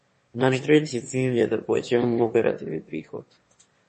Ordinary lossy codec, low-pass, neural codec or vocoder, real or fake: MP3, 32 kbps; 9.9 kHz; autoencoder, 22.05 kHz, a latent of 192 numbers a frame, VITS, trained on one speaker; fake